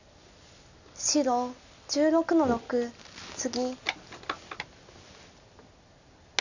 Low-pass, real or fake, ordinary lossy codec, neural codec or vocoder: 7.2 kHz; real; none; none